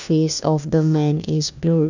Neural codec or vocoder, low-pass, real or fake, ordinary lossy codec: codec, 16 kHz, 1 kbps, FunCodec, trained on LibriTTS, 50 frames a second; 7.2 kHz; fake; none